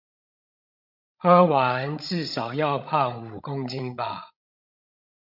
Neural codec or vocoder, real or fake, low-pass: codec, 16 kHz, 16 kbps, FreqCodec, larger model; fake; 5.4 kHz